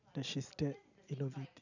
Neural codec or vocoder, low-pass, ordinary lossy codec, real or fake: none; 7.2 kHz; none; real